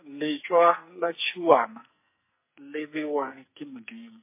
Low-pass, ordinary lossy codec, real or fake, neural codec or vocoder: 3.6 kHz; MP3, 24 kbps; fake; codec, 44.1 kHz, 2.6 kbps, SNAC